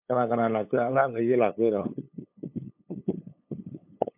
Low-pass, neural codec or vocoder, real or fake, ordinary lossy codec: 3.6 kHz; codec, 16 kHz, 8 kbps, FunCodec, trained on LibriTTS, 25 frames a second; fake; MP3, 32 kbps